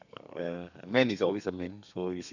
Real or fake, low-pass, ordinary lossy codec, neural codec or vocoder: fake; 7.2 kHz; none; codec, 32 kHz, 1.9 kbps, SNAC